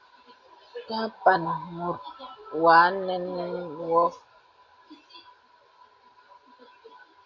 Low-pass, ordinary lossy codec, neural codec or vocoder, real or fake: 7.2 kHz; AAC, 48 kbps; none; real